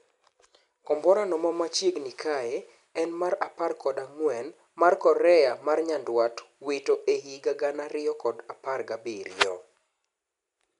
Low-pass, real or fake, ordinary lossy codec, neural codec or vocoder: 10.8 kHz; real; none; none